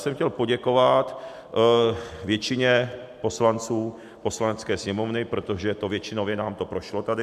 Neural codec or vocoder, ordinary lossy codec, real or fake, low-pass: none; MP3, 96 kbps; real; 14.4 kHz